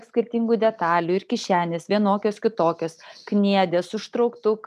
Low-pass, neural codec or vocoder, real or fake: 14.4 kHz; none; real